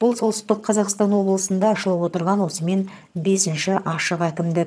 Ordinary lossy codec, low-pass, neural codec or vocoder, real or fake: none; none; vocoder, 22.05 kHz, 80 mel bands, HiFi-GAN; fake